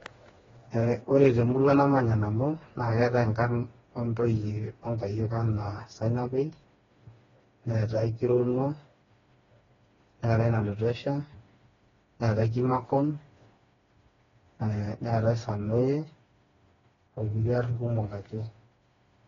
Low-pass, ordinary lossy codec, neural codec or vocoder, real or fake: 7.2 kHz; AAC, 24 kbps; codec, 16 kHz, 2 kbps, FreqCodec, smaller model; fake